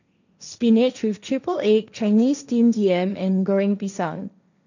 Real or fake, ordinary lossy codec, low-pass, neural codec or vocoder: fake; none; 7.2 kHz; codec, 16 kHz, 1.1 kbps, Voila-Tokenizer